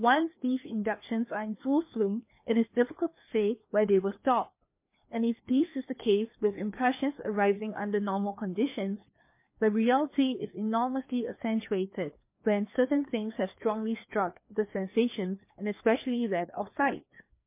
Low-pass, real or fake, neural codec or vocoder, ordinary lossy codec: 3.6 kHz; fake; codec, 16 kHz, 2 kbps, FreqCodec, larger model; MP3, 24 kbps